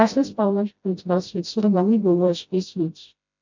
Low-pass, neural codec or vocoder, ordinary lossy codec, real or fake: 7.2 kHz; codec, 16 kHz, 0.5 kbps, FreqCodec, smaller model; MP3, 64 kbps; fake